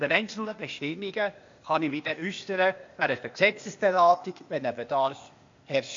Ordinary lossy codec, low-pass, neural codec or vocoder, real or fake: MP3, 48 kbps; 7.2 kHz; codec, 16 kHz, 0.8 kbps, ZipCodec; fake